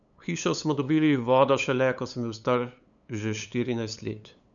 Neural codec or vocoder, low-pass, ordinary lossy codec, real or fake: codec, 16 kHz, 8 kbps, FunCodec, trained on LibriTTS, 25 frames a second; 7.2 kHz; none; fake